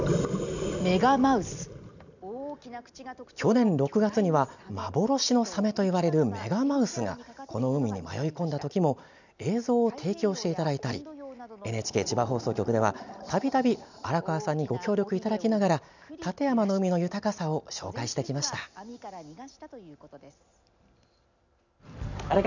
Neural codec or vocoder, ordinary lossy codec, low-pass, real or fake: none; none; 7.2 kHz; real